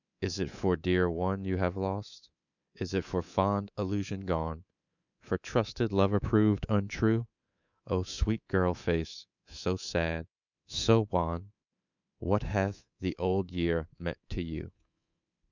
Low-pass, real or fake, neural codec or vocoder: 7.2 kHz; fake; codec, 24 kHz, 3.1 kbps, DualCodec